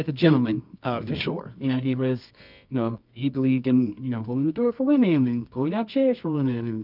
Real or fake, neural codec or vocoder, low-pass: fake; codec, 24 kHz, 0.9 kbps, WavTokenizer, medium music audio release; 5.4 kHz